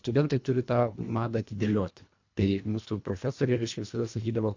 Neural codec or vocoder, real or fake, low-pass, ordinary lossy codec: codec, 24 kHz, 1.5 kbps, HILCodec; fake; 7.2 kHz; AAC, 48 kbps